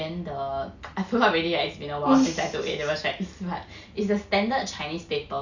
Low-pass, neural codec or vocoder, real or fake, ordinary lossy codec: 7.2 kHz; none; real; none